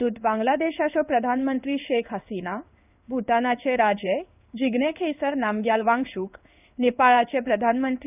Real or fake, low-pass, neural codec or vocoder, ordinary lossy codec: fake; 3.6 kHz; codec, 16 kHz in and 24 kHz out, 1 kbps, XY-Tokenizer; none